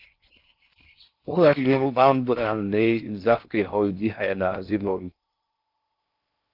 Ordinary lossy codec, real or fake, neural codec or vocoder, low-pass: Opus, 32 kbps; fake; codec, 16 kHz in and 24 kHz out, 0.6 kbps, FocalCodec, streaming, 4096 codes; 5.4 kHz